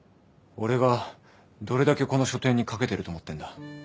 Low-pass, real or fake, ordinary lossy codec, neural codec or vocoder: none; real; none; none